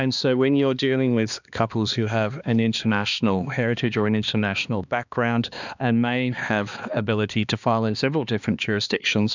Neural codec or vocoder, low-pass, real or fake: codec, 16 kHz, 2 kbps, X-Codec, HuBERT features, trained on balanced general audio; 7.2 kHz; fake